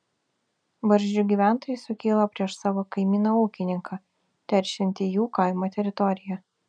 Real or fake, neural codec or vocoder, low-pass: real; none; 9.9 kHz